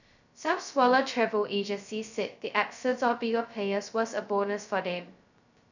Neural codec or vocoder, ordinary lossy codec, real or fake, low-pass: codec, 16 kHz, 0.2 kbps, FocalCodec; none; fake; 7.2 kHz